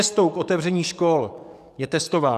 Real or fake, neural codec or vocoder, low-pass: fake; codec, 44.1 kHz, 7.8 kbps, DAC; 14.4 kHz